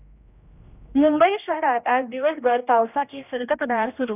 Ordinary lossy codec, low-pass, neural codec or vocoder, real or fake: none; 3.6 kHz; codec, 16 kHz, 1 kbps, X-Codec, HuBERT features, trained on general audio; fake